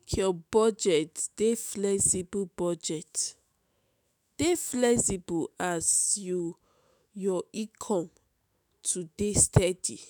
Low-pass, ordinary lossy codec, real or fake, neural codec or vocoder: none; none; fake; autoencoder, 48 kHz, 128 numbers a frame, DAC-VAE, trained on Japanese speech